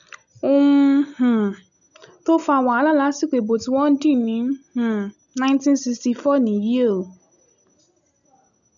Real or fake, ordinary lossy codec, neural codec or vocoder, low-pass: real; none; none; 7.2 kHz